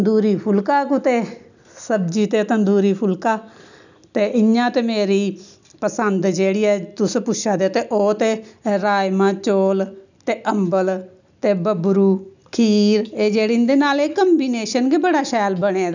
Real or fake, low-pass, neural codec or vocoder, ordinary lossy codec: real; 7.2 kHz; none; none